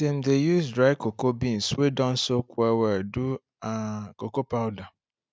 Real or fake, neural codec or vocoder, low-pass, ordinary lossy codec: real; none; none; none